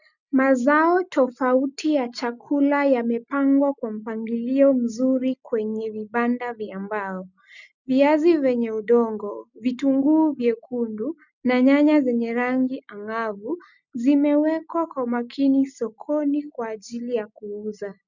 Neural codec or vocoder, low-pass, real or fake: none; 7.2 kHz; real